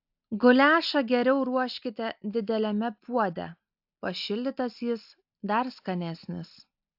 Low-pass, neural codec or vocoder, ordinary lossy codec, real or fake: 5.4 kHz; none; AAC, 48 kbps; real